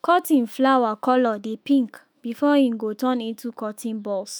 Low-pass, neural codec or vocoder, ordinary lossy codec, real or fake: none; autoencoder, 48 kHz, 128 numbers a frame, DAC-VAE, trained on Japanese speech; none; fake